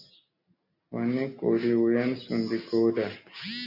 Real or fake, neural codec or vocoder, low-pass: real; none; 5.4 kHz